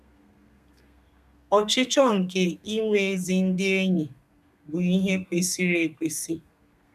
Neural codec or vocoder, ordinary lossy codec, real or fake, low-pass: codec, 32 kHz, 1.9 kbps, SNAC; none; fake; 14.4 kHz